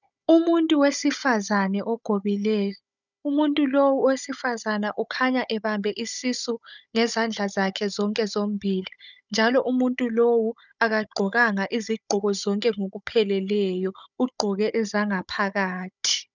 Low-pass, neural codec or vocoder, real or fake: 7.2 kHz; codec, 16 kHz, 16 kbps, FunCodec, trained on Chinese and English, 50 frames a second; fake